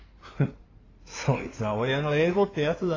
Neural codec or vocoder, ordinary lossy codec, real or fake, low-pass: codec, 16 kHz in and 24 kHz out, 2.2 kbps, FireRedTTS-2 codec; AAC, 32 kbps; fake; 7.2 kHz